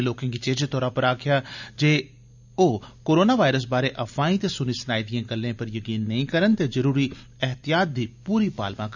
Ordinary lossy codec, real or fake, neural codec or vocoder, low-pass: none; real; none; 7.2 kHz